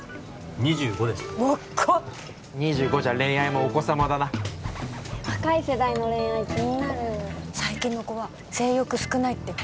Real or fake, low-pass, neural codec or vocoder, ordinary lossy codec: real; none; none; none